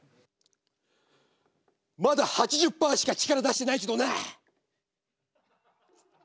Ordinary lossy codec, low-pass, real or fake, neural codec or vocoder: none; none; real; none